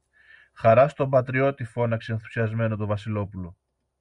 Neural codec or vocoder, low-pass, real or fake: none; 10.8 kHz; real